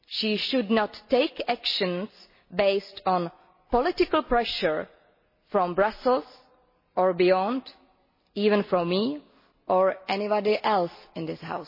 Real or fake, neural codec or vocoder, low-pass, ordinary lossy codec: real; none; 5.4 kHz; MP3, 24 kbps